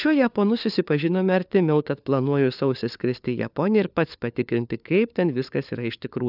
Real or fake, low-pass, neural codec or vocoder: fake; 5.4 kHz; codec, 16 kHz, 4 kbps, FunCodec, trained on LibriTTS, 50 frames a second